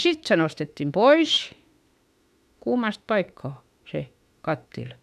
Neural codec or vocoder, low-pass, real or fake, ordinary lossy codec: autoencoder, 48 kHz, 32 numbers a frame, DAC-VAE, trained on Japanese speech; 14.4 kHz; fake; none